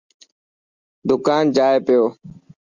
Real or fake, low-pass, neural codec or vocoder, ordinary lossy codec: real; 7.2 kHz; none; Opus, 64 kbps